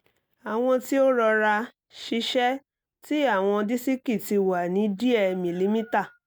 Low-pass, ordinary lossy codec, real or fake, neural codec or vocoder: none; none; real; none